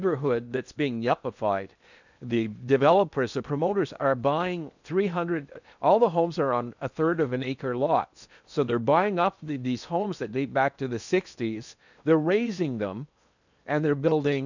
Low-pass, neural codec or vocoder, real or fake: 7.2 kHz; codec, 16 kHz in and 24 kHz out, 0.8 kbps, FocalCodec, streaming, 65536 codes; fake